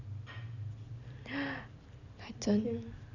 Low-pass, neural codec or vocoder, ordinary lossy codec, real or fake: 7.2 kHz; none; none; real